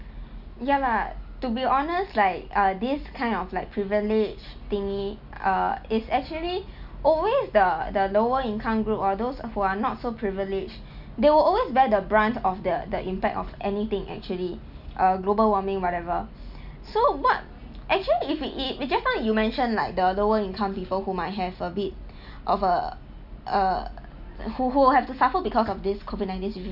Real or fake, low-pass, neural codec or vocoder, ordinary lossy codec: real; 5.4 kHz; none; none